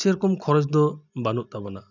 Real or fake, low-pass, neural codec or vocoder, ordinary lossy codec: real; 7.2 kHz; none; none